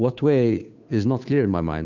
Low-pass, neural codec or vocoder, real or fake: 7.2 kHz; codec, 16 kHz, 2 kbps, FunCodec, trained on Chinese and English, 25 frames a second; fake